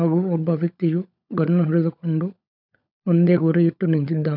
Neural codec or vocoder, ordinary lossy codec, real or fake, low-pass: codec, 16 kHz, 16 kbps, FunCodec, trained on LibriTTS, 50 frames a second; none; fake; 5.4 kHz